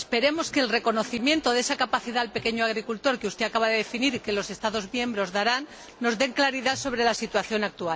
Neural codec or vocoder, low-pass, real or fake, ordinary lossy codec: none; none; real; none